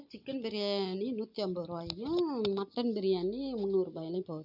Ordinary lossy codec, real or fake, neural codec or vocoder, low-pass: none; real; none; 5.4 kHz